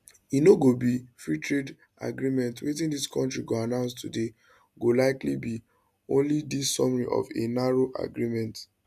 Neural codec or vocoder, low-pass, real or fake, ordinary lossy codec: none; 14.4 kHz; real; none